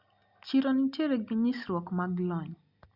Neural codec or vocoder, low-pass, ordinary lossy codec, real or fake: none; 5.4 kHz; Opus, 64 kbps; real